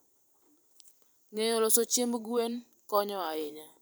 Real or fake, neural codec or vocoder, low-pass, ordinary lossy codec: fake; vocoder, 44.1 kHz, 128 mel bands every 512 samples, BigVGAN v2; none; none